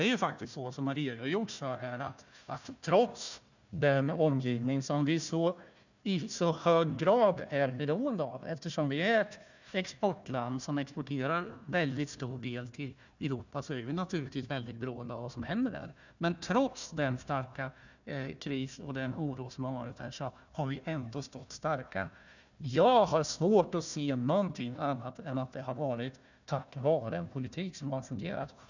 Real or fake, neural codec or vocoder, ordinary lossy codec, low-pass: fake; codec, 16 kHz, 1 kbps, FunCodec, trained on Chinese and English, 50 frames a second; none; 7.2 kHz